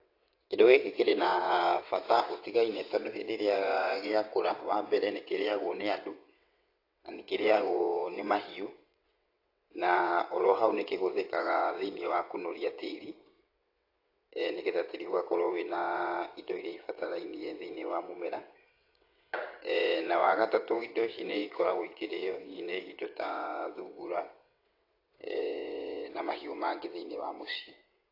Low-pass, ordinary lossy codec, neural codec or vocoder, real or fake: 5.4 kHz; AAC, 32 kbps; vocoder, 22.05 kHz, 80 mel bands, WaveNeXt; fake